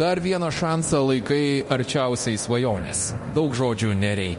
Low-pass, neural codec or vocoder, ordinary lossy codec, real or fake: 10.8 kHz; codec, 24 kHz, 0.9 kbps, DualCodec; MP3, 48 kbps; fake